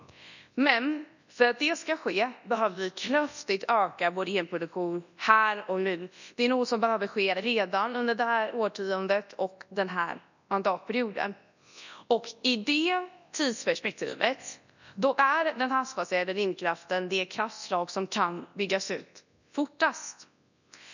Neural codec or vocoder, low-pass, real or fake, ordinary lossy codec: codec, 24 kHz, 0.9 kbps, WavTokenizer, large speech release; 7.2 kHz; fake; none